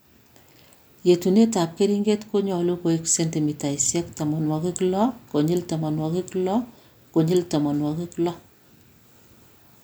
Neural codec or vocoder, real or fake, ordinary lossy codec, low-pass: none; real; none; none